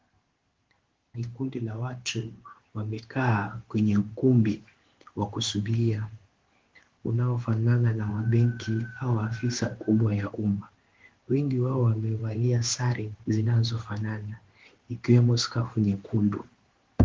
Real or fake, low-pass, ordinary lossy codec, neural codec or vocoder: fake; 7.2 kHz; Opus, 16 kbps; codec, 16 kHz in and 24 kHz out, 1 kbps, XY-Tokenizer